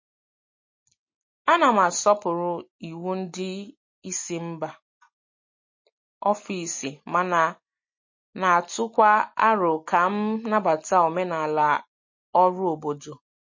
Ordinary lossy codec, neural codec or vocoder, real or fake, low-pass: MP3, 32 kbps; none; real; 7.2 kHz